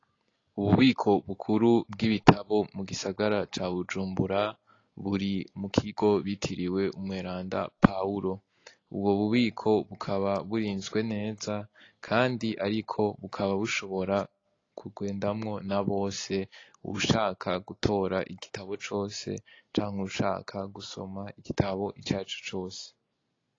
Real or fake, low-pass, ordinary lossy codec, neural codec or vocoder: real; 7.2 kHz; AAC, 32 kbps; none